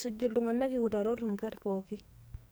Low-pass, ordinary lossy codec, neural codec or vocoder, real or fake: none; none; codec, 44.1 kHz, 2.6 kbps, SNAC; fake